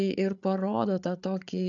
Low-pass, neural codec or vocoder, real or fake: 7.2 kHz; codec, 16 kHz, 8 kbps, FreqCodec, larger model; fake